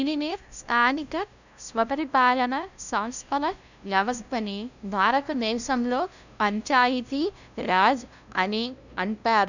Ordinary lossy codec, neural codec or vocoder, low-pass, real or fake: none; codec, 16 kHz, 0.5 kbps, FunCodec, trained on LibriTTS, 25 frames a second; 7.2 kHz; fake